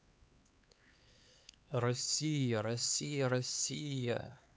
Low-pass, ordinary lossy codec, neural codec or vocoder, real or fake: none; none; codec, 16 kHz, 4 kbps, X-Codec, HuBERT features, trained on LibriSpeech; fake